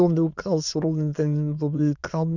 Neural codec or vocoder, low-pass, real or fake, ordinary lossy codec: autoencoder, 22.05 kHz, a latent of 192 numbers a frame, VITS, trained on many speakers; 7.2 kHz; fake; none